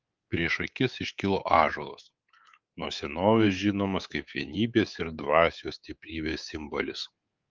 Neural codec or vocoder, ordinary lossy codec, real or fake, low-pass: codec, 24 kHz, 3.1 kbps, DualCodec; Opus, 32 kbps; fake; 7.2 kHz